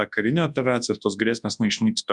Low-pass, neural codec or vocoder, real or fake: 10.8 kHz; codec, 24 kHz, 0.9 kbps, WavTokenizer, large speech release; fake